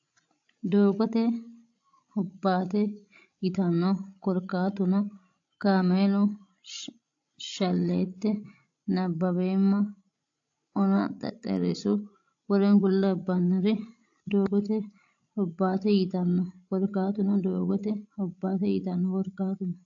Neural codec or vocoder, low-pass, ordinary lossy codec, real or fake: codec, 16 kHz, 16 kbps, FreqCodec, larger model; 7.2 kHz; AAC, 48 kbps; fake